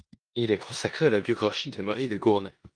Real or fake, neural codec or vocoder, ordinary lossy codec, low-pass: fake; codec, 16 kHz in and 24 kHz out, 0.9 kbps, LongCat-Audio-Codec, four codebook decoder; Opus, 32 kbps; 9.9 kHz